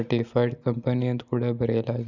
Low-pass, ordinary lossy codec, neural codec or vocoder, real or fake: 7.2 kHz; none; none; real